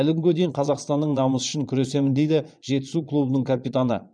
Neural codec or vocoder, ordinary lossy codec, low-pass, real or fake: vocoder, 22.05 kHz, 80 mel bands, Vocos; none; 9.9 kHz; fake